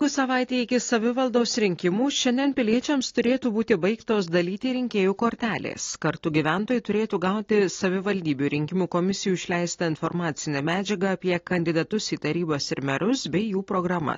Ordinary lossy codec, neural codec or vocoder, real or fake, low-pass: AAC, 32 kbps; none; real; 7.2 kHz